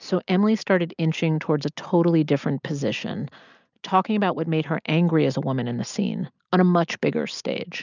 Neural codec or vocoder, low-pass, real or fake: none; 7.2 kHz; real